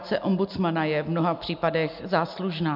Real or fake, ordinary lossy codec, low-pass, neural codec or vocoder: real; MP3, 48 kbps; 5.4 kHz; none